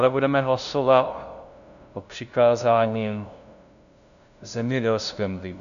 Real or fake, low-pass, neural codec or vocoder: fake; 7.2 kHz; codec, 16 kHz, 0.5 kbps, FunCodec, trained on LibriTTS, 25 frames a second